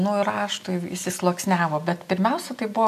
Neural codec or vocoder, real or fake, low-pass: none; real; 14.4 kHz